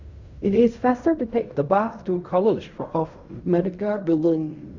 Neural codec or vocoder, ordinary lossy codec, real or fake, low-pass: codec, 16 kHz in and 24 kHz out, 0.4 kbps, LongCat-Audio-Codec, fine tuned four codebook decoder; Opus, 64 kbps; fake; 7.2 kHz